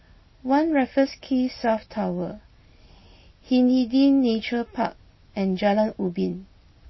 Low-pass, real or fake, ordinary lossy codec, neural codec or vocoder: 7.2 kHz; real; MP3, 24 kbps; none